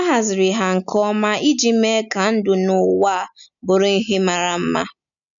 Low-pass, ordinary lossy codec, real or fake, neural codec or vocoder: 7.2 kHz; none; real; none